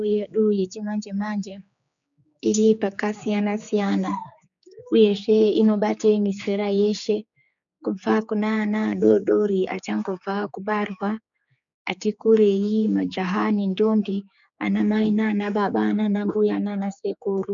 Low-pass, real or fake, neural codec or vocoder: 7.2 kHz; fake; codec, 16 kHz, 4 kbps, X-Codec, HuBERT features, trained on general audio